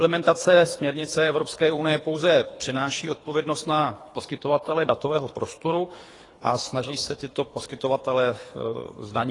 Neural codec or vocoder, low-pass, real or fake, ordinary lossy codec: codec, 24 kHz, 3 kbps, HILCodec; 10.8 kHz; fake; AAC, 32 kbps